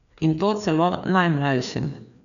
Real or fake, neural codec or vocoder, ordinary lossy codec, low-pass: fake; codec, 16 kHz, 2 kbps, FreqCodec, larger model; none; 7.2 kHz